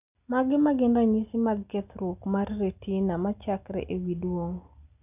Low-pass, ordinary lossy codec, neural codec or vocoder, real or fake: 3.6 kHz; none; none; real